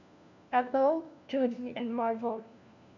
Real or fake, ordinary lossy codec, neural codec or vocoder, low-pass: fake; none; codec, 16 kHz, 1 kbps, FunCodec, trained on LibriTTS, 50 frames a second; 7.2 kHz